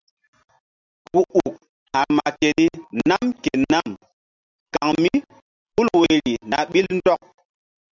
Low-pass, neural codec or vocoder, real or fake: 7.2 kHz; none; real